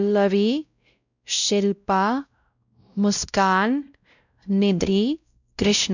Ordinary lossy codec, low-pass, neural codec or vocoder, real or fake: none; 7.2 kHz; codec, 16 kHz, 0.5 kbps, X-Codec, WavLM features, trained on Multilingual LibriSpeech; fake